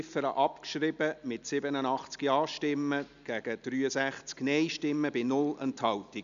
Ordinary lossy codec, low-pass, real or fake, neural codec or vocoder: none; 7.2 kHz; real; none